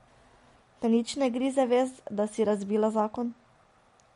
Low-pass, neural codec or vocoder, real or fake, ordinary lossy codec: 10.8 kHz; none; real; MP3, 48 kbps